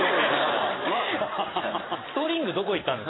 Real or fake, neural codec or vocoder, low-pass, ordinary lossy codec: fake; vocoder, 44.1 kHz, 128 mel bands every 256 samples, BigVGAN v2; 7.2 kHz; AAC, 16 kbps